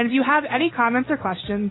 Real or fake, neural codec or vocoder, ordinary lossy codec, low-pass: real; none; AAC, 16 kbps; 7.2 kHz